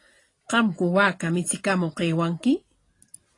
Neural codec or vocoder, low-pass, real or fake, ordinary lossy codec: vocoder, 44.1 kHz, 128 mel bands every 256 samples, BigVGAN v2; 10.8 kHz; fake; AAC, 32 kbps